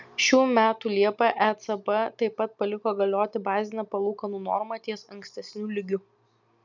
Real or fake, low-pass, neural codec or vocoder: real; 7.2 kHz; none